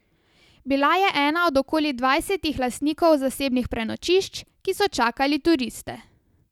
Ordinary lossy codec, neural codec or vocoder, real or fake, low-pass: none; none; real; 19.8 kHz